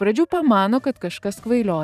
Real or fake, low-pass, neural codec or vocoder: fake; 14.4 kHz; vocoder, 44.1 kHz, 128 mel bands every 512 samples, BigVGAN v2